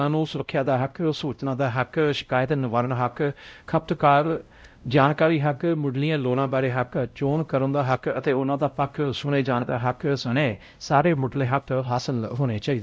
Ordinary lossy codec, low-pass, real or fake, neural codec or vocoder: none; none; fake; codec, 16 kHz, 0.5 kbps, X-Codec, WavLM features, trained on Multilingual LibriSpeech